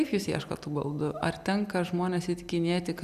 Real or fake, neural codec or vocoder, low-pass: real; none; 14.4 kHz